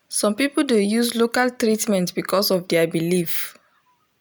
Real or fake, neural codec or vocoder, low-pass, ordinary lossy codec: real; none; none; none